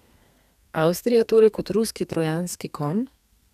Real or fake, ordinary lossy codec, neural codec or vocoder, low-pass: fake; none; codec, 32 kHz, 1.9 kbps, SNAC; 14.4 kHz